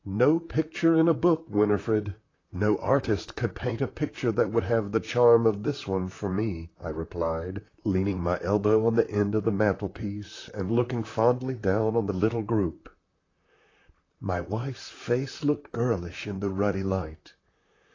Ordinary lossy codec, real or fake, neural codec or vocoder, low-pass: AAC, 32 kbps; fake; vocoder, 44.1 kHz, 128 mel bands, Pupu-Vocoder; 7.2 kHz